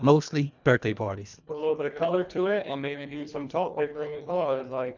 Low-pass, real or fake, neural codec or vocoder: 7.2 kHz; fake; codec, 24 kHz, 1.5 kbps, HILCodec